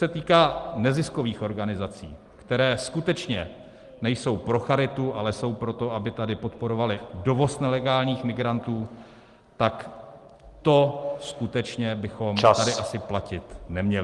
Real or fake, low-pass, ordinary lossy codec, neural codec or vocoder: real; 10.8 kHz; Opus, 24 kbps; none